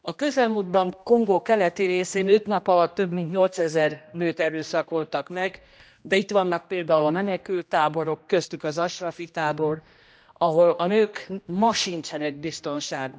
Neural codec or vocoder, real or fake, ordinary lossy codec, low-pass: codec, 16 kHz, 1 kbps, X-Codec, HuBERT features, trained on general audio; fake; none; none